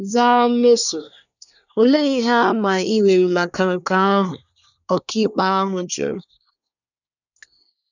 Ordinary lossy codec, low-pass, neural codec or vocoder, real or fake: none; 7.2 kHz; codec, 24 kHz, 1 kbps, SNAC; fake